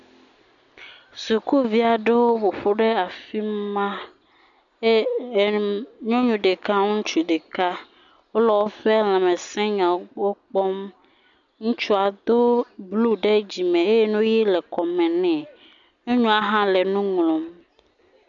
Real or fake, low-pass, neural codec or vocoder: real; 7.2 kHz; none